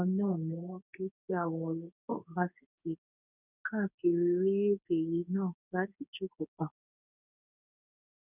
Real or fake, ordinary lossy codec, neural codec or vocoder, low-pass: fake; none; codec, 24 kHz, 0.9 kbps, WavTokenizer, medium speech release version 2; 3.6 kHz